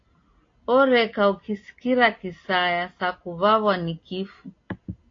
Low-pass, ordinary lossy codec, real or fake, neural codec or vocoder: 7.2 kHz; AAC, 32 kbps; real; none